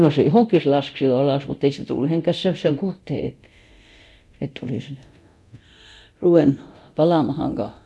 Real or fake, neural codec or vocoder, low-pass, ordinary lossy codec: fake; codec, 24 kHz, 0.9 kbps, DualCodec; none; none